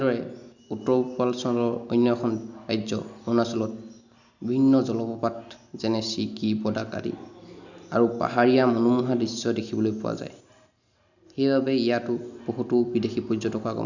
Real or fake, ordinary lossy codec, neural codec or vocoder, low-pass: real; none; none; 7.2 kHz